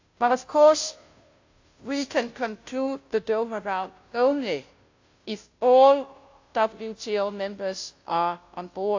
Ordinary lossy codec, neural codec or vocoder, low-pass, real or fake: AAC, 48 kbps; codec, 16 kHz, 0.5 kbps, FunCodec, trained on Chinese and English, 25 frames a second; 7.2 kHz; fake